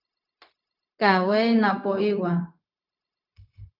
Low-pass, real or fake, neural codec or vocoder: 5.4 kHz; fake; codec, 16 kHz, 0.4 kbps, LongCat-Audio-Codec